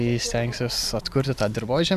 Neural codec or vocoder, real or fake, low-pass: none; real; 14.4 kHz